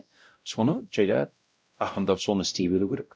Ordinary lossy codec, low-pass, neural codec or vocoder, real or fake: none; none; codec, 16 kHz, 0.5 kbps, X-Codec, WavLM features, trained on Multilingual LibriSpeech; fake